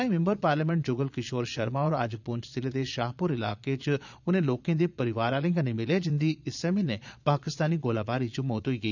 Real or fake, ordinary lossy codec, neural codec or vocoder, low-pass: fake; none; vocoder, 22.05 kHz, 80 mel bands, Vocos; 7.2 kHz